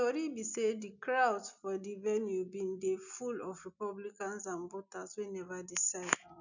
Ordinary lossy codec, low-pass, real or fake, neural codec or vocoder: none; 7.2 kHz; real; none